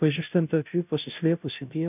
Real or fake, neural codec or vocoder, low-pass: fake; codec, 16 kHz, 0.5 kbps, FunCodec, trained on Chinese and English, 25 frames a second; 3.6 kHz